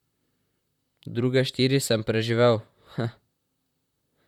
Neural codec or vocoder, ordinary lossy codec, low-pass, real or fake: vocoder, 44.1 kHz, 128 mel bands, Pupu-Vocoder; none; 19.8 kHz; fake